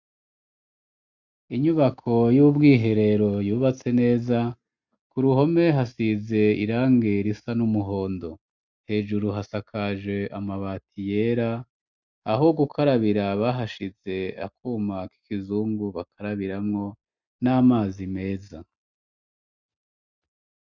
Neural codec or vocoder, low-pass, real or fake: none; 7.2 kHz; real